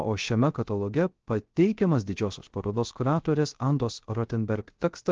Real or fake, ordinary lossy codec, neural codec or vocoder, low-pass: fake; Opus, 16 kbps; codec, 16 kHz, 0.3 kbps, FocalCodec; 7.2 kHz